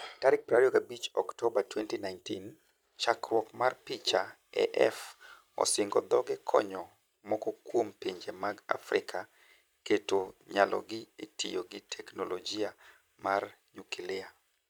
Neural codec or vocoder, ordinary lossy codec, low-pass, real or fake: vocoder, 44.1 kHz, 128 mel bands every 256 samples, BigVGAN v2; none; none; fake